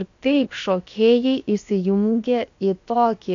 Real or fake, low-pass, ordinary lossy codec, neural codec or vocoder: fake; 7.2 kHz; AAC, 48 kbps; codec, 16 kHz, about 1 kbps, DyCAST, with the encoder's durations